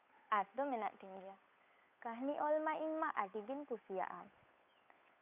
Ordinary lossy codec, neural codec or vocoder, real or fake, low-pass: none; codec, 16 kHz, 8 kbps, FunCodec, trained on Chinese and English, 25 frames a second; fake; 3.6 kHz